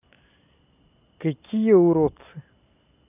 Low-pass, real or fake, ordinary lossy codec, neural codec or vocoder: 3.6 kHz; real; AAC, 32 kbps; none